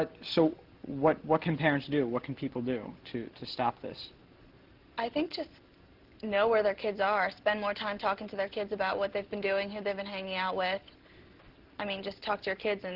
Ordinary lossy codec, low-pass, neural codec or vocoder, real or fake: Opus, 16 kbps; 5.4 kHz; none; real